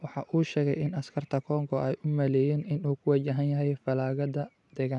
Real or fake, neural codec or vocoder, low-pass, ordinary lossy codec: real; none; 10.8 kHz; none